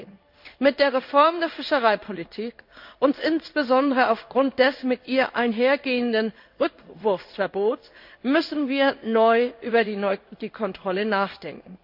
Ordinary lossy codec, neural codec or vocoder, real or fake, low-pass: none; codec, 16 kHz in and 24 kHz out, 1 kbps, XY-Tokenizer; fake; 5.4 kHz